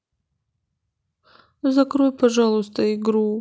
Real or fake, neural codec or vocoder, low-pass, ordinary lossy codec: real; none; none; none